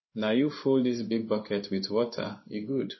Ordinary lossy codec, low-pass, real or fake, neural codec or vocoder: MP3, 24 kbps; 7.2 kHz; fake; codec, 16 kHz in and 24 kHz out, 1 kbps, XY-Tokenizer